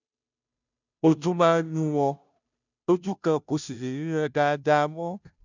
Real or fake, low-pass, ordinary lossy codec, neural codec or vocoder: fake; 7.2 kHz; none; codec, 16 kHz, 0.5 kbps, FunCodec, trained on Chinese and English, 25 frames a second